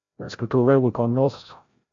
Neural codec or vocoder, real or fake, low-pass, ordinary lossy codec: codec, 16 kHz, 0.5 kbps, FreqCodec, larger model; fake; 7.2 kHz; MP3, 64 kbps